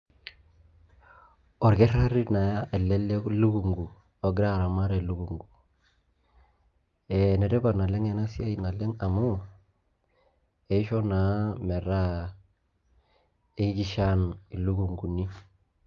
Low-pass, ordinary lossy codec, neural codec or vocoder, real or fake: 7.2 kHz; Opus, 32 kbps; none; real